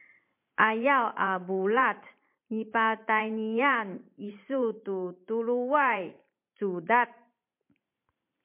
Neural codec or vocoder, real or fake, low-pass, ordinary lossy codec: vocoder, 44.1 kHz, 128 mel bands every 512 samples, BigVGAN v2; fake; 3.6 kHz; MP3, 32 kbps